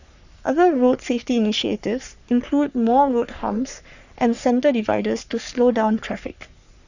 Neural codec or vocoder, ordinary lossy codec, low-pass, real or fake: codec, 44.1 kHz, 3.4 kbps, Pupu-Codec; none; 7.2 kHz; fake